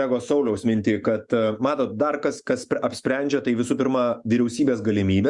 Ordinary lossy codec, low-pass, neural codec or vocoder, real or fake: Opus, 64 kbps; 10.8 kHz; autoencoder, 48 kHz, 128 numbers a frame, DAC-VAE, trained on Japanese speech; fake